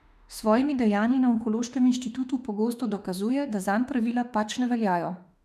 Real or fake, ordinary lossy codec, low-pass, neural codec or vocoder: fake; none; 14.4 kHz; autoencoder, 48 kHz, 32 numbers a frame, DAC-VAE, trained on Japanese speech